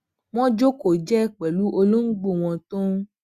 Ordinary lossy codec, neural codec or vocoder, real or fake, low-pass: Opus, 64 kbps; none; real; 14.4 kHz